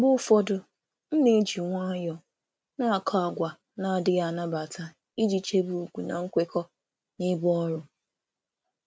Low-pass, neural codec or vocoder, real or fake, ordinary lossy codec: none; none; real; none